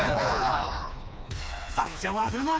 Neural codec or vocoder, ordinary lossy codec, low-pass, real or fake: codec, 16 kHz, 2 kbps, FreqCodec, smaller model; none; none; fake